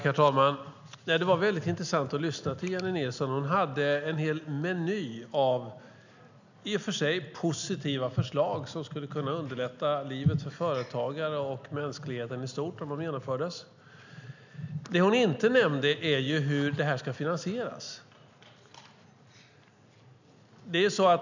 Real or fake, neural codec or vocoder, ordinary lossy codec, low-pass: real; none; none; 7.2 kHz